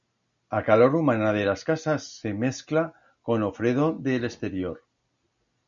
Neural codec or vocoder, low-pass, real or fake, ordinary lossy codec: none; 7.2 kHz; real; MP3, 96 kbps